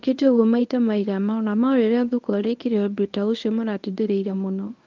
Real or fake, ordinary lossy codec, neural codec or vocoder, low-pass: fake; Opus, 24 kbps; codec, 24 kHz, 0.9 kbps, WavTokenizer, medium speech release version 1; 7.2 kHz